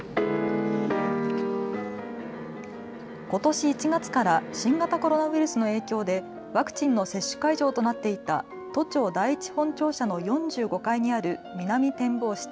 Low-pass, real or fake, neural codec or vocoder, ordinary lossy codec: none; real; none; none